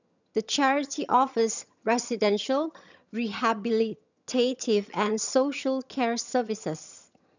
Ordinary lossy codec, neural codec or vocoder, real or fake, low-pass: none; vocoder, 22.05 kHz, 80 mel bands, HiFi-GAN; fake; 7.2 kHz